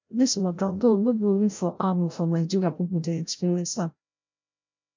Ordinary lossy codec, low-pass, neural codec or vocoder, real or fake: MP3, 64 kbps; 7.2 kHz; codec, 16 kHz, 0.5 kbps, FreqCodec, larger model; fake